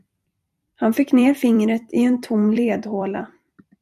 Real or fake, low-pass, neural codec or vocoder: fake; 14.4 kHz; vocoder, 44.1 kHz, 128 mel bands every 256 samples, BigVGAN v2